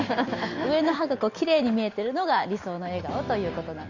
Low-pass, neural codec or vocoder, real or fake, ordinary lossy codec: 7.2 kHz; none; real; Opus, 64 kbps